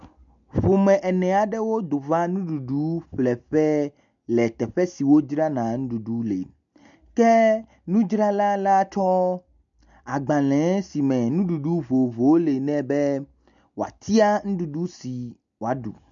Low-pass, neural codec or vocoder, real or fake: 7.2 kHz; none; real